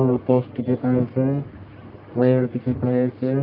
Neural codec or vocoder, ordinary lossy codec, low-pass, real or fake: codec, 44.1 kHz, 1.7 kbps, Pupu-Codec; Opus, 32 kbps; 5.4 kHz; fake